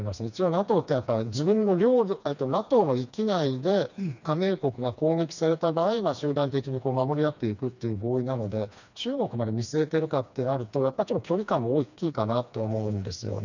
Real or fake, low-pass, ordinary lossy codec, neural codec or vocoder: fake; 7.2 kHz; none; codec, 16 kHz, 2 kbps, FreqCodec, smaller model